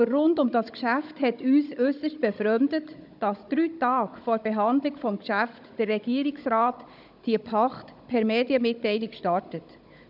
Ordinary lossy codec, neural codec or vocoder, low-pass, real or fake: none; codec, 16 kHz, 16 kbps, FunCodec, trained on Chinese and English, 50 frames a second; 5.4 kHz; fake